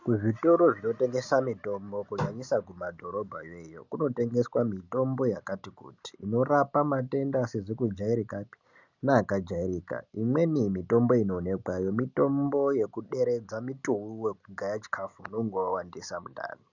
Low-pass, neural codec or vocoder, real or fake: 7.2 kHz; none; real